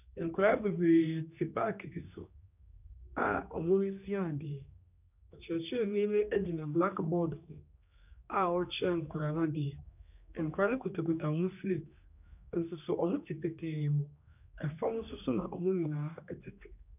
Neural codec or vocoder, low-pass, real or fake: codec, 16 kHz, 2 kbps, X-Codec, HuBERT features, trained on general audio; 3.6 kHz; fake